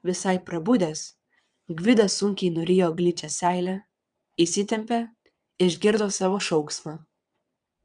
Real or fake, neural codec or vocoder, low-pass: fake; vocoder, 22.05 kHz, 80 mel bands, WaveNeXt; 9.9 kHz